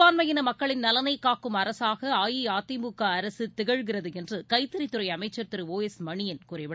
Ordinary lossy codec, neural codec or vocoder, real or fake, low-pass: none; none; real; none